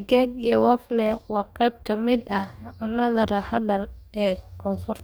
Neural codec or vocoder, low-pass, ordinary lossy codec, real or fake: codec, 44.1 kHz, 2.6 kbps, DAC; none; none; fake